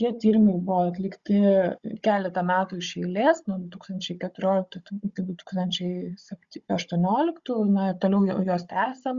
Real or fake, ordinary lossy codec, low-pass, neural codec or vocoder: fake; Opus, 64 kbps; 7.2 kHz; codec, 16 kHz, 16 kbps, FunCodec, trained on Chinese and English, 50 frames a second